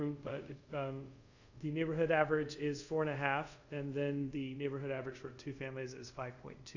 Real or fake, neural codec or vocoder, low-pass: fake; codec, 24 kHz, 0.5 kbps, DualCodec; 7.2 kHz